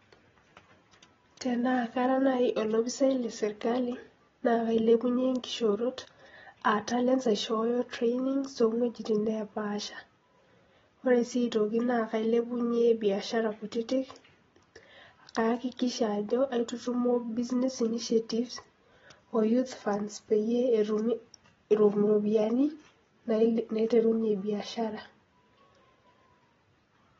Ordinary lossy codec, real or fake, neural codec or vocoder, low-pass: AAC, 24 kbps; real; none; 7.2 kHz